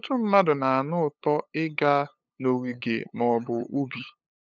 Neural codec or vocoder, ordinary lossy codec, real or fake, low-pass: codec, 16 kHz, 8 kbps, FunCodec, trained on LibriTTS, 25 frames a second; none; fake; none